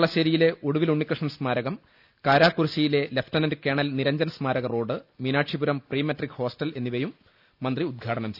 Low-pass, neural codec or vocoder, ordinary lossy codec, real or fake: 5.4 kHz; none; none; real